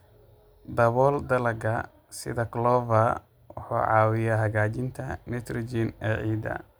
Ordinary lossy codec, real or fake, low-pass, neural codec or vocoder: none; real; none; none